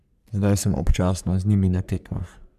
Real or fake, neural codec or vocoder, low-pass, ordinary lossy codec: fake; codec, 44.1 kHz, 3.4 kbps, Pupu-Codec; 14.4 kHz; none